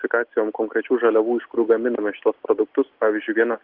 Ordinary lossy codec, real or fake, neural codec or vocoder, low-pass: Opus, 16 kbps; real; none; 5.4 kHz